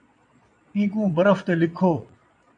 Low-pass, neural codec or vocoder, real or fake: 9.9 kHz; vocoder, 22.05 kHz, 80 mel bands, Vocos; fake